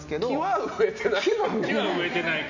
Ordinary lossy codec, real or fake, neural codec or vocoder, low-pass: none; real; none; 7.2 kHz